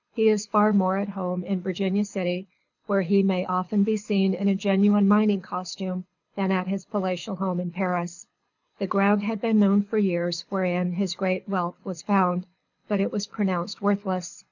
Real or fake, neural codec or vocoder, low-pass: fake; codec, 24 kHz, 6 kbps, HILCodec; 7.2 kHz